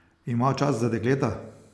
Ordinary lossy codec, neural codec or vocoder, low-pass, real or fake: none; none; none; real